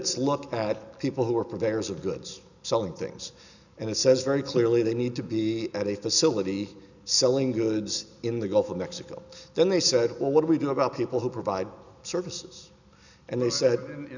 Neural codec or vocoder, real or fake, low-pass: none; real; 7.2 kHz